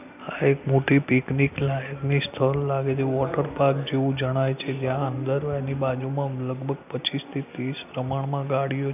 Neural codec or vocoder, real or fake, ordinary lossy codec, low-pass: none; real; none; 3.6 kHz